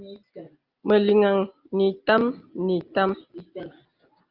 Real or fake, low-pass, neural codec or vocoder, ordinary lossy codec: real; 5.4 kHz; none; Opus, 24 kbps